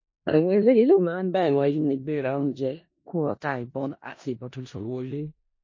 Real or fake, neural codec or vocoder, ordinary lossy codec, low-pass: fake; codec, 16 kHz in and 24 kHz out, 0.4 kbps, LongCat-Audio-Codec, four codebook decoder; MP3, 32 kbps; 7.2 kHz